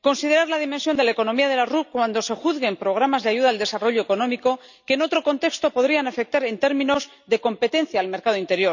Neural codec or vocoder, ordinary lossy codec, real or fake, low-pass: none; none; real; 7.2 kHz